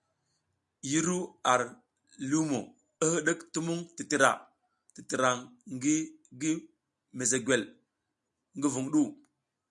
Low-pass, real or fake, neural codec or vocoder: 10.8 kHz; real; none